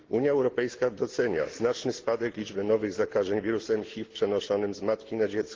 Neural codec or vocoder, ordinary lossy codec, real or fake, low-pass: none; Opus, 16 kbps; real; 7.2 kHz